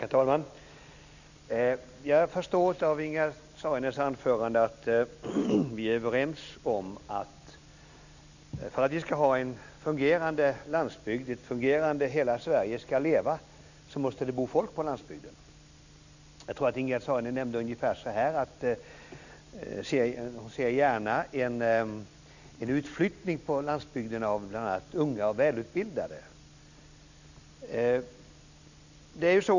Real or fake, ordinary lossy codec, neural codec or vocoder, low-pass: real; none; none; 7.2 kHz